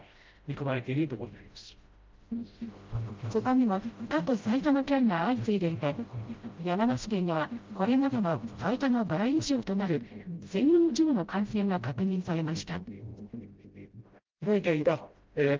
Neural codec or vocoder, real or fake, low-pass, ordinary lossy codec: codec, 16 kHz, 0.5 kbps, FreqCodec, smaller model; fake; 7.2 kHz; Opus, 32 kbps